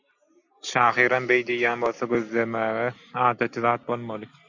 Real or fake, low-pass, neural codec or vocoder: real; 7.2 kHz; none